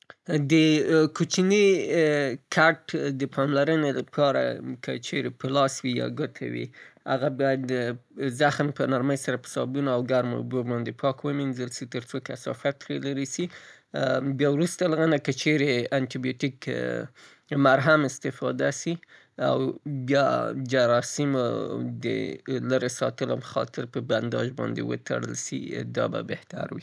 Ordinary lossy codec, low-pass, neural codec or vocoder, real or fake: none; none; none; real